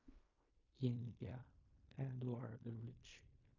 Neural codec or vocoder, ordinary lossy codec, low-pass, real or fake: codec, 16 kHz in and 24 kHz out, 0.4 kbps, LongCat-Audio-Codec, fine tuned four codebook decoder; AAC, 32 kbps; 7.2 kHz; fake